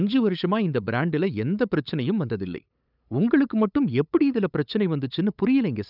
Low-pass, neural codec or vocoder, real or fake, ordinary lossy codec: 5.4 kHz; codec, 16 kHz, 8 kbps, FunCodec, trained on LibriTTS, 25 frames a second; fake; none